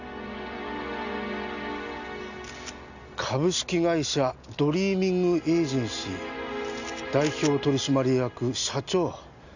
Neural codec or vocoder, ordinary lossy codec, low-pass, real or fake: none; none; 7.2 kHz; real